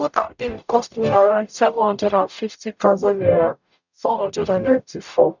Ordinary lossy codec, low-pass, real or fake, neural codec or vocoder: none; 7.2 kHz; fake; codec, 44.1 kHz, 0.9 kbps, DAC